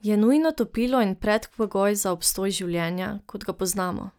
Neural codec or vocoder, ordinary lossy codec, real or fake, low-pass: none; none; real; none